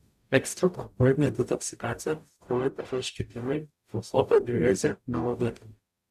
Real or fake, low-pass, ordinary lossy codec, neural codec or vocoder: fake; 14.4 kHz; AAC, 96 kbps; codec, 44.1 kHz, 0.9 kbps, DAC